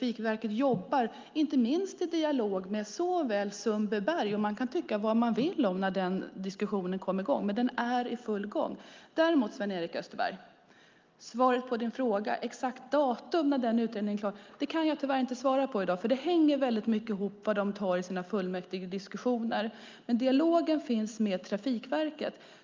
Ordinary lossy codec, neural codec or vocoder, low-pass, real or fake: Opus, 24 kbps; none; 7.2 kHz; real